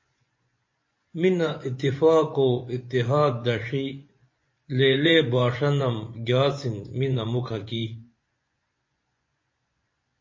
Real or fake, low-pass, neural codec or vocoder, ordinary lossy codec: fake; 7.2 kHz; vocoder, 24 kHz, 100 mel bands, Vocos; MP3, 32 kbps